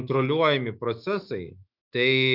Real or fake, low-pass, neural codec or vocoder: fake; 5.4 kHz; autoencoder, 48 kHz, 128 numbers a frame, DAC-VAE, trained on Japanese speech